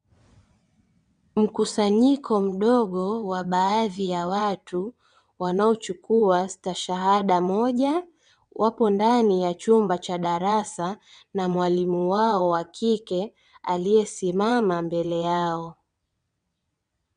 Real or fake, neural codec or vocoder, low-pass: fake; vocoder, 22.05 kHz, 80 mel bands, WaveNeXt; 9.9 kHz